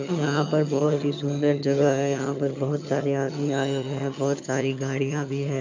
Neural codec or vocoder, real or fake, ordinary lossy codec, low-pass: vocoder, 22.05 kHz, 80 mel bands, HiFi-GAN; fake; MP3, 64 kbps; 7.2 kHz